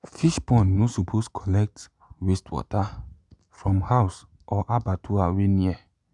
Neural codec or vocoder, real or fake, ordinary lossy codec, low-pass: none; real; none; 10.8 kHz